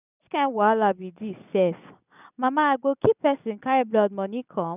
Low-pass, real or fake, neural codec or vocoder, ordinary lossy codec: 3.6 kHz; real; none; none